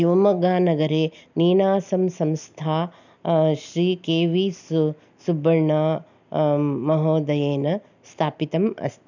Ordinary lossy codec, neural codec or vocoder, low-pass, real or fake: none; none; 7.2 kHz; real